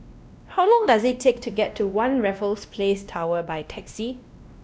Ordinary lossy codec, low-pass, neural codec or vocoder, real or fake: none; none; codec, 16 kHz, 1 kbps, X-Codec, WavLM features, trained on Multilingual LibriSpeech; fake